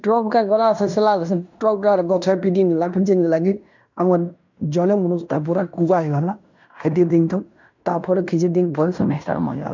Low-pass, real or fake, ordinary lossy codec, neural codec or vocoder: 7.2 kHz; fake; none; codec, 16 kHz in and 24 kHz out, 0.9 kbps, LongCat-Audio-Codec, fine tuned four codebook decoder